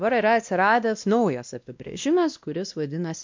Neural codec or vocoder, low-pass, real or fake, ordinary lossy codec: codec, 16 kHz, 1 kbps, X-Codec, WavLM features, trained on Multilingual LibriSpeech; 7.2 kHz; fake; MP3, 64 kbps